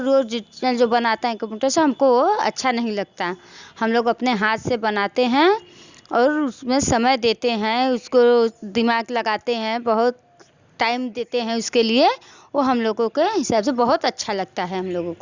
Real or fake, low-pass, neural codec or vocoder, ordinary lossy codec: real; 7.2 kHz; none; Opus, 64 kbps